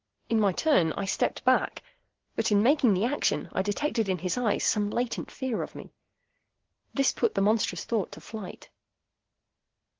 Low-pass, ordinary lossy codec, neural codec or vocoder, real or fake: 7.2 kHz; Opus, 16 kbps; none; real